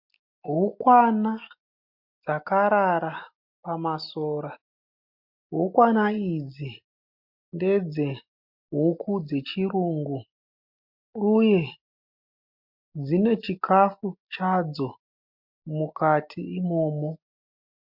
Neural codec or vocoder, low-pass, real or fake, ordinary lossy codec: none; 5.4 kHz; real; MP3, 48 kbps